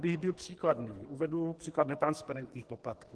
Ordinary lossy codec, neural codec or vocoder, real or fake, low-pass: Opus, 16 kbps; codec, 44.1 kHz, 2.6 kbps, SNAC; fake; 10.8 kHz